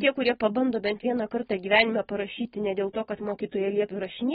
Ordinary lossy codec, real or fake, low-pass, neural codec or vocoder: AAC, 16 kbps; real; 19.8 kHz; none